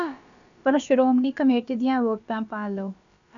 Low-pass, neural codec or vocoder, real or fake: 7.2 kHz; codec, 16 kHz, about 1 kbps, DyCAST, with the encoder's durations; fake